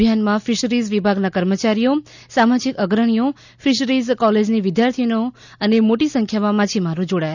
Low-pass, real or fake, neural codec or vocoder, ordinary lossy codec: 7.2 kHz; real; none; none